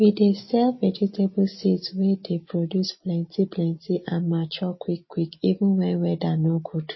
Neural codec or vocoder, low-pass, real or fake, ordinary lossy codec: none; 7.2 kHz; real; MP3, 24 kbps